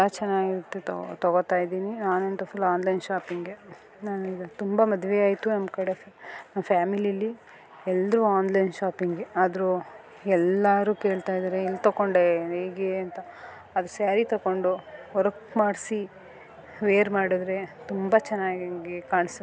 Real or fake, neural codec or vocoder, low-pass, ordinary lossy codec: real; none; none; none